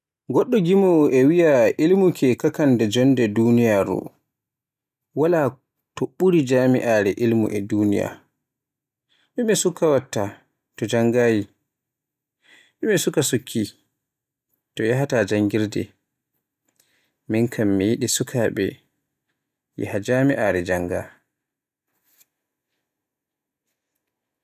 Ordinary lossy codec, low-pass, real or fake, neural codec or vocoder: none; 14.4 kHz; real; none